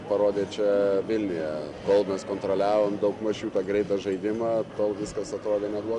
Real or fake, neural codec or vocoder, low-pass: real; none; 10.8 kHz